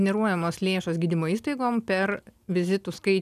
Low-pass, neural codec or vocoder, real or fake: 14.4 kHz; none; real